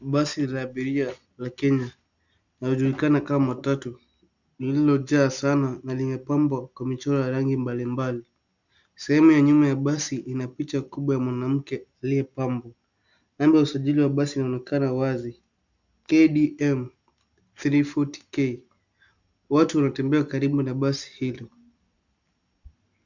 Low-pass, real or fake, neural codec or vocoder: 7.2 kHz; real; none